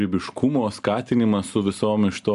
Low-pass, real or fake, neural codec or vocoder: 10.8 kHz; real; none